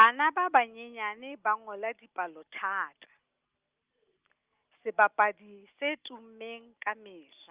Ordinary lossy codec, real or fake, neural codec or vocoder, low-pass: Opus, 24 kbps; real; none; 3.6 kHz